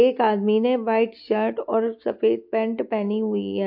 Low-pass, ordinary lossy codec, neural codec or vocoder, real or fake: 5.4 kHz; MP3, 48 kbps; none; real